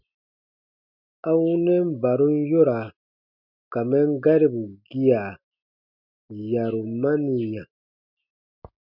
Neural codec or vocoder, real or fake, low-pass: none; real; 5.4 kHz